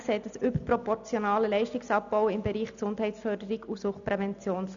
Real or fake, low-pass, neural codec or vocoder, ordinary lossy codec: real; 7.2 kHz; none; none